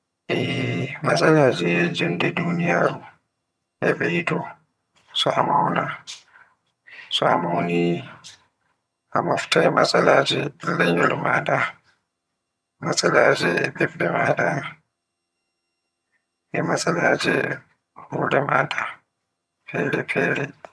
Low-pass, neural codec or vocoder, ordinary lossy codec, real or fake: none; vocoder, 22.05 kHz, 80 mel bands, HiFi-GAN; none; fake